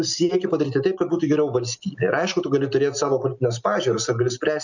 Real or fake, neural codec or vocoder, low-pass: real; none; 7.2 kHz